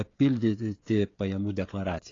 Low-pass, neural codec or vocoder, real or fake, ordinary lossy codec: 7.2 kHz; codec, 16 kHz, 16 kbps, FreqCodec, smaller model; fake; AAC, 32 kbps